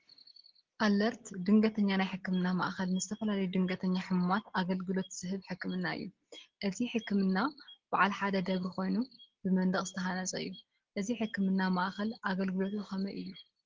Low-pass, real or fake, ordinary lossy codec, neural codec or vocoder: 7.2 kHz; real; Opus, 16 kbps; none